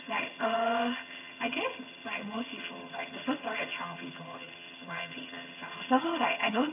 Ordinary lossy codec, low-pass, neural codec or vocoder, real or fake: none; 3.6 kHz; vocoder, 22.05 kHz, 80 mel bands, HiFi-GAN; fake